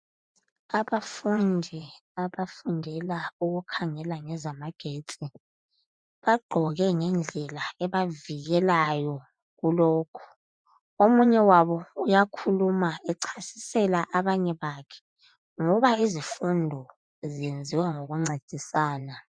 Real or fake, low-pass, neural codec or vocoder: fake; 9.9 kHz; vocoder, 24 kHz, 100 mel bands, Vocos